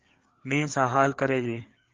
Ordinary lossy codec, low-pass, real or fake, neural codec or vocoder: Opus, 32 kbps; 7.2 kHz; fake; codec, 16 kHz, 4 kbps, FreqCodec, larger model